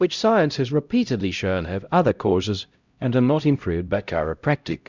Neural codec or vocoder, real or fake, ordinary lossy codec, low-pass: codec, 16 kHz, 0.5 kbps, X-Codec, HuBERT features, trained on LibriSpeech; fake; Opus, 64 kbps; 7.2 kHz